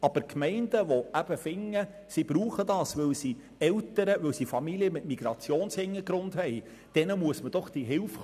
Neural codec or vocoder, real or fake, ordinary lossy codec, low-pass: none; real; none; 14.4 kHz